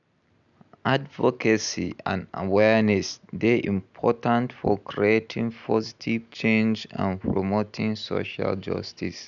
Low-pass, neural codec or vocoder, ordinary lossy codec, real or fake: 7.2 kHz; none; none; real